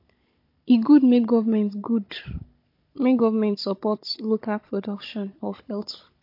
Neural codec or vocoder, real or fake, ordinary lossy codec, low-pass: codec, 16 kHz, 16 kbps, FunCodec, trained on Chinese and English, 50 frames a second; fake; MP3, 32 kbps; 5.4 kHz